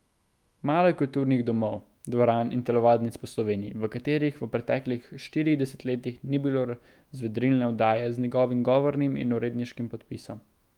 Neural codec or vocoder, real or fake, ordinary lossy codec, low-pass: autoencoder, 48 kHz, 128 numbers a frame, DAC-VAE, trained on Japanese speech; fake; Opus, 32 kbps; 19.8 kHz